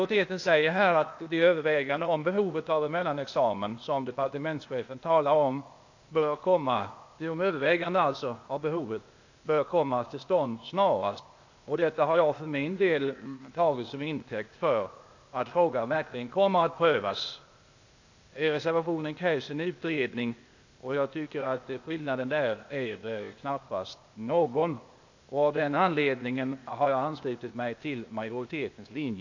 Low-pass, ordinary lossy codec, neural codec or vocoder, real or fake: 7.2 kHz; AAC, 48 kbps; codec, 16 kHz, 0.8 kbps, ZipCodec; fake